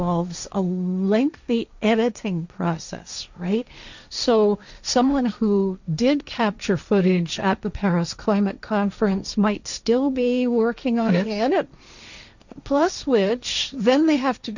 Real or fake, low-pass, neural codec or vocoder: fake; 7.2 kHz; codec, 16 kHz, 1.1 kbps, Voila-Tokenizer